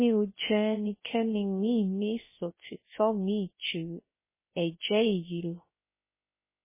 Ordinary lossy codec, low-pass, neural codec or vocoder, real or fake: MP3, 16 kbps; 3.6 kHz; codec, 16 kHz, 0.3 kbps, FocalCodec; fake